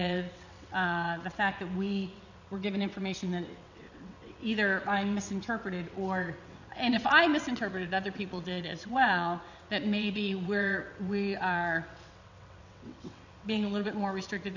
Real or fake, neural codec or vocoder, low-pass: fake; vocoder, 22.05 kHz, 80 mel bands, WaveNeXt; 7.2 kHz